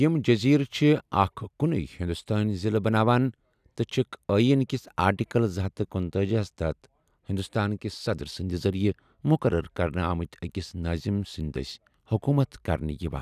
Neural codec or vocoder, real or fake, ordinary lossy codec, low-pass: none; real; none; 14.4 kHz